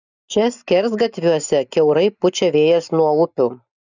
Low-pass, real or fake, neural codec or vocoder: 7.2 kHz; real; none